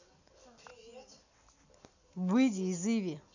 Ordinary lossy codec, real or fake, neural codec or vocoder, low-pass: none; real; none; 7.2 kHz